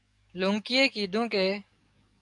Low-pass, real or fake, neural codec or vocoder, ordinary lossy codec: 10.8 kHz; fake; codec, 44.1 kHz, 7.8 kbps, DAC; MP3, 96 kbps